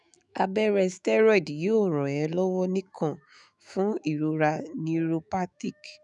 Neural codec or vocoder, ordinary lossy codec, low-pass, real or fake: autoencoder, 48 kHz, 128 numbers a frame, DAC-VAE, trained on Japanese speech; none; 10.8 kHz; fake